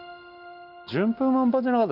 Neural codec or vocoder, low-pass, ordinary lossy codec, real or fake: none; 5.4 kHz; none; real